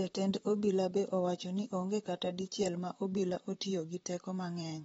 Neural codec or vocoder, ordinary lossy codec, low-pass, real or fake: vocoder, 44.1 kHz, 128 mel bands, Pupu-Vocoder; AAC, 24 kbps; 19.8 kHz; fake